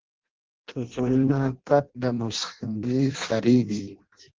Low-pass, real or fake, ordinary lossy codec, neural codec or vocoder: 7.2 kHz; fake; Opus, 16 kbps; codec, 16 kHz in and 24 kHz out, 0.6 kbps, FireRedTTS-2 codec